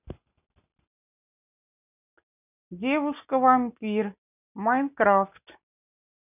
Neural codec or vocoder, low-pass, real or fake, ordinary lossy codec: none; 3.6 kHz; real; none